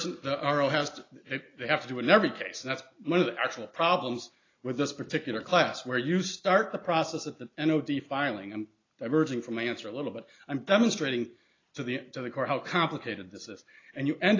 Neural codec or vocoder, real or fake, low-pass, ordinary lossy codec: none; real; 7.2 kHz; AAC, 32 kbps